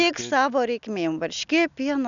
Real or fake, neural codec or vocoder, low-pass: real; none; 7.2 kHz